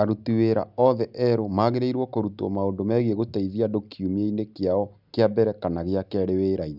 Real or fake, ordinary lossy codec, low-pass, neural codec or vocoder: real; none; 5.4 kHz; none